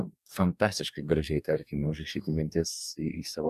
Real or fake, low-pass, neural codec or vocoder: fake; 14.4 kHz; codec, 44.1 kHz, 2.6 kbps, DAC